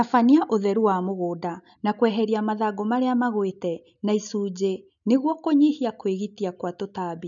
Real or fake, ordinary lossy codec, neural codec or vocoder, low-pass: real; none; none; 7.2 kHz